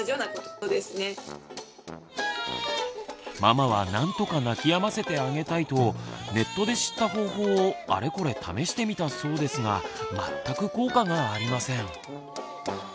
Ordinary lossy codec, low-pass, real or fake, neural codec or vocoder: none; none; real; none